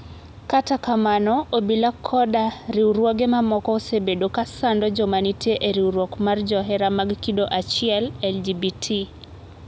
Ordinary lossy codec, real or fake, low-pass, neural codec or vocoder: none; real; none; none